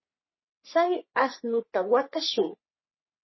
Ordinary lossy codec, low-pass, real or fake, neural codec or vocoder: MP3, 24 kbps; 7.2 kHz; fake; codec, 44.1 kHz, 3.4 kbps, Pupu-Codec